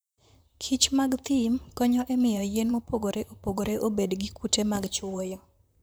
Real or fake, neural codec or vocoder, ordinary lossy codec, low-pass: fake; vocoder, 44.1 kHz, 128 mel bands, Pupu-Vocoder; none; none